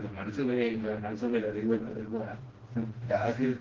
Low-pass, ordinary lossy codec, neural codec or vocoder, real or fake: 7.2 kHz; Opus, 16 kbps; codec, 16 kHz, 1 kbps, FreqCodec, smaller model; fake